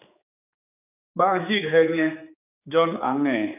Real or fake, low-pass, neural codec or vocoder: fake; 3.6 kHz; codec, 16 kHz, 4 kbps, X-Codec, HuBERT features, trained on general audio